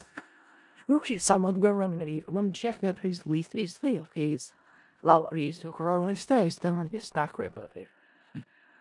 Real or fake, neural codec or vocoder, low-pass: fake; codec, 16 kHz in and 24 kHz out, 0.4 kbps, LongCat-Audio-Codec, four codebook decoder; 10.8 kHz